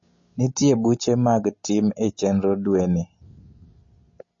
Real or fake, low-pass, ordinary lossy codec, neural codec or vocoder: real; 7.2 kHz; MP3, 32 kbps; none